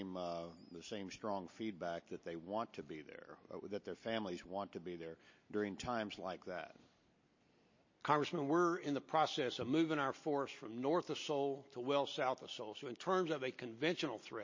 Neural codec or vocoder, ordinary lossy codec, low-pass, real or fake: none; MP3, 32 kbps; 7.2 kHz; real